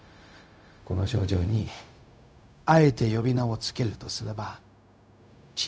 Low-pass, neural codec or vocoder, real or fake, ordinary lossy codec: none; codec, 16 kHz, 0.4 kbps, LongCat-Audio-Codec; fake; none